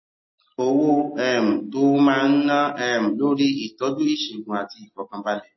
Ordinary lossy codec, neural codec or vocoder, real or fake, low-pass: MP3, 24 kbps; none; real; 7.2 kHz